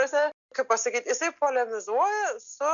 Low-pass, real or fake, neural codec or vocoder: 7.2 kHz; real; none